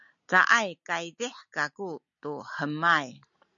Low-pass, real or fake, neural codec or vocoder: 7.2 kHz; real; none